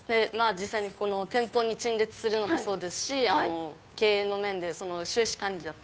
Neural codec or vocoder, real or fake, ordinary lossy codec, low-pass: codec, 16 kHz, 2 kbps, FunCodec, trained on Chinese and English, 25 frames a second; fake; none; none